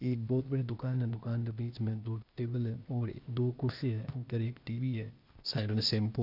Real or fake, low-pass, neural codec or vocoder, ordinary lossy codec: fake; 5.4 kHz; codec, 16 kHz, 0.8 kbps, ZipCodec; none